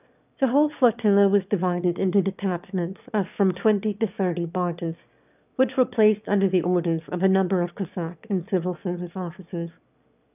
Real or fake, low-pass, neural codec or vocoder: fake; 3.6 kHz; autoencoder, 22.05 kHz, a latent of 192 numbers a frame, VITS, trained on one speaker